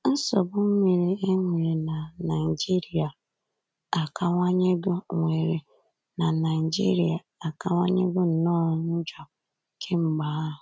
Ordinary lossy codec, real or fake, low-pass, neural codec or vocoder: none; real; none; none